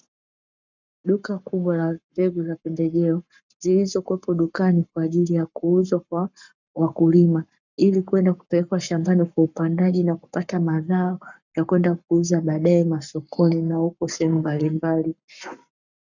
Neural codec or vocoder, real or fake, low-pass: codec, 44.1 kHz, 7.8 kbps, Pupu-Codec; fake; 7.2 kHz